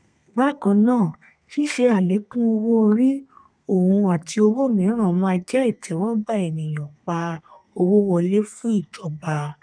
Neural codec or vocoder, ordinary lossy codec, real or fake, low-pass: codec, 32 kHz, 1.9 kbps, SNAC; none; fake; 9.9 kHz